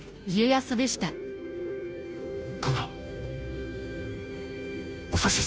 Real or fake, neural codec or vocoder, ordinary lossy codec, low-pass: fake; codec, 16 kHz, 0.5 kbps, FunCodec, trained on Chinese and English, 25 frames a second; none; none